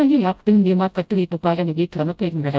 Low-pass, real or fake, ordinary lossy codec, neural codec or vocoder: none; fake; none; codec, 16 kHz, 0.5 kbps, FreqCodec, smaller model